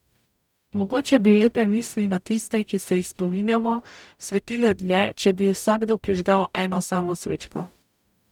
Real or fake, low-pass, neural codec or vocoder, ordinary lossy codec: fake; 19.8 kHz; codec, 44.1 kHz, 0.9 kbps, DAC; none